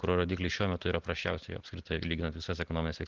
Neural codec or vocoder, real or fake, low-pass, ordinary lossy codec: none; real; 7.2 kHz; Opus, 16 kbps